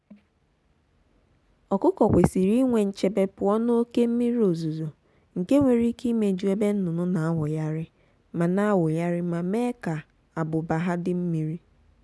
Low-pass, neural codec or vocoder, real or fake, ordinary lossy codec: none; none; real; none